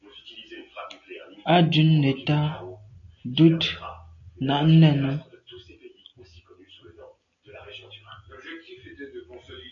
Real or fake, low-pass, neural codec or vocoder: real; 7.2 kHz; none